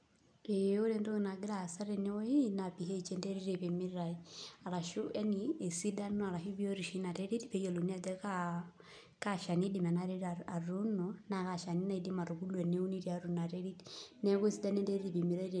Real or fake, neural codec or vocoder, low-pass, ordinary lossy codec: real; none; 9.9 kHz; none